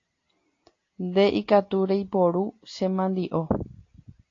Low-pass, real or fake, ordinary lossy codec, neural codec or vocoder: 7.2 kHz; real; AAC, 32 kbps; none